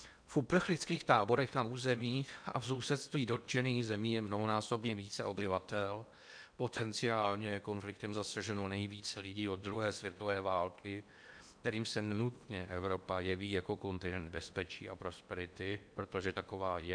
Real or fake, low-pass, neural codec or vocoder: fake; 9.9 kHz; codec, 16 kHz in and 24 kHz out, 0.8 kbps, FocalCodec, streaming, 65536 codes